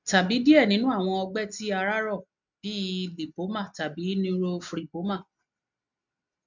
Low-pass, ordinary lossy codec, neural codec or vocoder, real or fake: 7.2 kHz; none; none; real